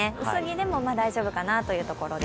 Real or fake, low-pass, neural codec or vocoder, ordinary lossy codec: real; none; none; none